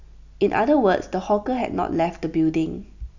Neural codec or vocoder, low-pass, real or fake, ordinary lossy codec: none; 7.2 kHz; real; none